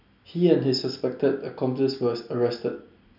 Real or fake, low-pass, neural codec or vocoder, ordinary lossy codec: real; 5.4 kHz; none; none